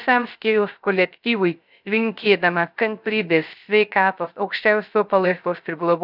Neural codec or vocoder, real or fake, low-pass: codec, 16 kHz, 0.3 kbps, FocalCodec; fake; 5.4 kHz